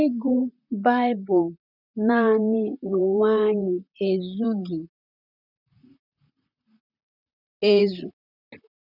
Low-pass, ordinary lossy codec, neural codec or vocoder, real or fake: 5.4 kHz; none; vocoder, 44.1 kHz, 128 mel bands, Pupu-Vocoder; fake